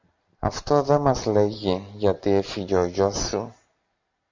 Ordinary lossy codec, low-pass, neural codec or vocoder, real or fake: MP3, 64 kbps; 7.2 kHz; none; real